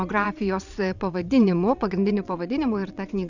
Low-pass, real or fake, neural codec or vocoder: 7.2 kHz; fake; vocoder, 22.05 kHz, 80 mel bands, WaveNeXt